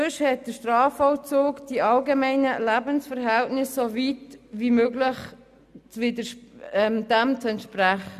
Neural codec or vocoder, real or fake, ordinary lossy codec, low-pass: none; real; none; 14.4 kHz